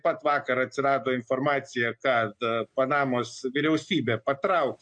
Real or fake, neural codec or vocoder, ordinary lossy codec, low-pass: real; none; MP3, 48 kbps; 9.9 kHz